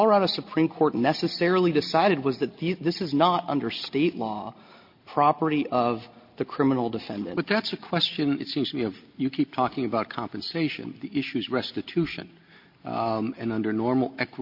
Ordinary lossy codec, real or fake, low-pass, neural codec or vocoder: MP3, 32 kbps; real; 5.4 kHz; none